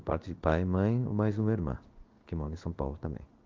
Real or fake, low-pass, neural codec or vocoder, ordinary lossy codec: fake; 7.2 kHz; codec, 16 kHz in and 24 kHz out, 1 kbps, XY-Tokenizer; Opus, 32 kbps